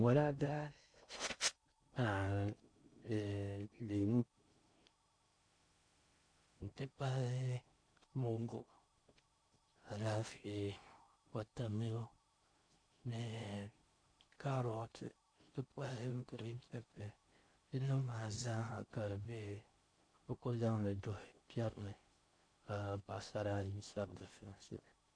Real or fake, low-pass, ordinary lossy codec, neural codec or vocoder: fake; 9.9 kHz; MP3, 48 kbps; codec, 16 kHz in and 24 kHz out, 0.6 kbps, FocalCodec, streaming, 2048 codes